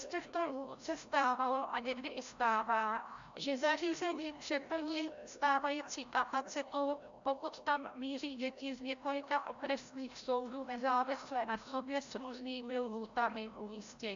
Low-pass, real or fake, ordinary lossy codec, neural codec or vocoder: 7.2 kHz; fake; AAC, 64 kbps; codec, 16 kHz, 0.5 kbps, FreqCodec, larger model